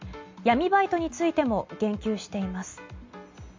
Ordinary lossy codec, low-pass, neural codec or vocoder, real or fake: MP3, 64 kbps; 7.2 kHz; none; real